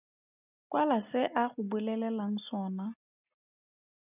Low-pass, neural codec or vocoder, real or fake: 3.6 kHz; none; real